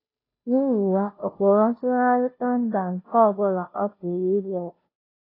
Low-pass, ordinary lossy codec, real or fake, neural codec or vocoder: 5.4 kHz; AAC, 24 kbps; fake; codec, 16 kHz, 0.5 kbps, FunCodec, trained on Chinese and English, 25 frames a second